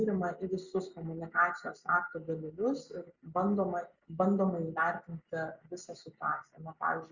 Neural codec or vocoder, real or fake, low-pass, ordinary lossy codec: none; real; 7.2 kHz; Opus, 64 kbps